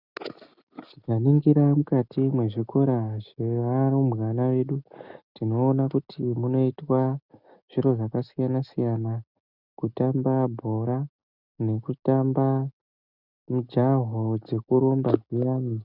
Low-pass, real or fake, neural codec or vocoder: 5.4 kHz; real; none